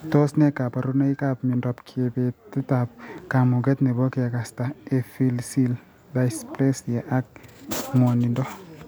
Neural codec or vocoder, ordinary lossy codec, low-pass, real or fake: none; none; none; real